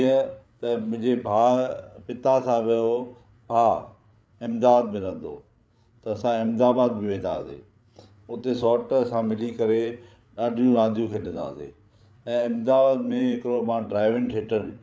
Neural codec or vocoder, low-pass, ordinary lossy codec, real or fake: codec, 16 kHz, 8 kbps, FreqCodec, larger model; none; none; fake